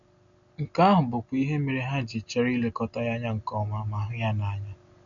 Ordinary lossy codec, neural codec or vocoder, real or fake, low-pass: none; none; real; 7.2 kHz